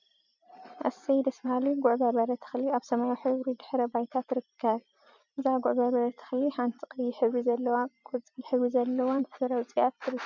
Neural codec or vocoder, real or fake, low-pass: vocoder, 44.1 kHz, 128 mel bands every 256 samples, BigVGAN v2; fake; 7.2 kHz